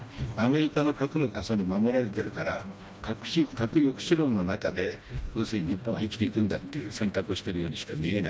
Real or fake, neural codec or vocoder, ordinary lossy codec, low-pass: fake; codec, 16 kHz, 1 kbps, FreqCodec, smaller model; none; none